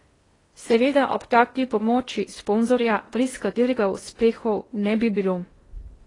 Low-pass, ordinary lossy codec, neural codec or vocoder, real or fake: 10.8 kHz; AAC, 32 kbps; codec, 16 kHz in and 24 kHz out, 0.6 kbps, FocalCodec, streaming, 2048 codes; fake